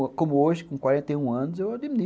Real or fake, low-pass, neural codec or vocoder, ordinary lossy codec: real; none; none; none